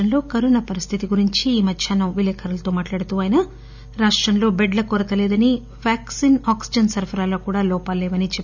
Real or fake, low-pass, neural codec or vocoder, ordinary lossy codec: real; 7.2 kHz; none; none